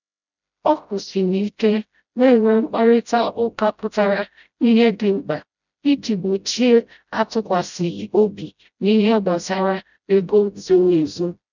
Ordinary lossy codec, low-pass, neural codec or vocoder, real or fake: none; 7.2 kHz; codec, 16 kHz, 0.5 kbps, FreqCodec, smaller model; fake